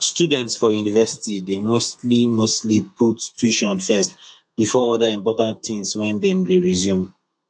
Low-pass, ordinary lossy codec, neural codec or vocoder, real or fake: 9.9 kHz; AAC, 64 kbps; codec, 32 kHz, 1.9 kbps, SNAC; fake